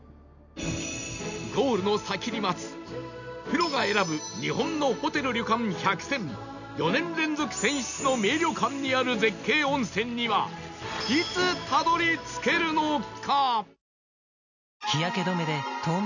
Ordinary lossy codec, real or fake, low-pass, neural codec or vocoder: none; real; 7.2 kHz; none